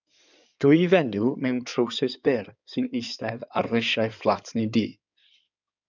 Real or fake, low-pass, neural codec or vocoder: fake; 7.2 kHz; codec, 16 kHz in and 24 kHz out, 2.2 kbps, FireRedTTS-2 codec